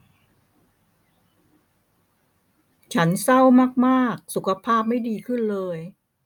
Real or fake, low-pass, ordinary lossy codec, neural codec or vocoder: real; 19.8 kHz; none; none